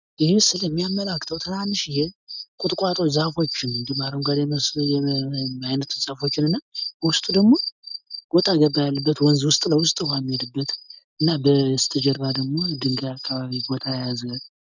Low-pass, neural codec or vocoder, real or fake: 7.2 kHz; none; real